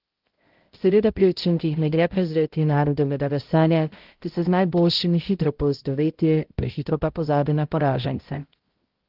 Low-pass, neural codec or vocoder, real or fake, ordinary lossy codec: 5.4 kHz; codec, 16 kHz, 0.5 kbps, X-Codec, HuBERT features, trained on balanced general audio; fake; Opus, 16 kbps